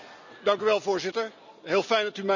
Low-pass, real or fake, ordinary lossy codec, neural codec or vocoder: 7.2 kHz; real; none; none